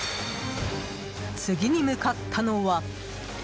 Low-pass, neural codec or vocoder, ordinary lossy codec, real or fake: none; none; none; real